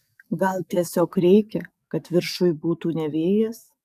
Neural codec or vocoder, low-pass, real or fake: codec, 44.1 kHz, 7.8 kbps, DAC; 14.4 kHz; fake